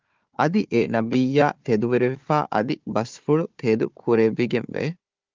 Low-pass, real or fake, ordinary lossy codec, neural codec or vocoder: 7.2 kHz; fake; Opus, 32 kbps; codec, 16 kHz, 4 kbps, FunCodec, trained on Chinese and English, 50 frames a second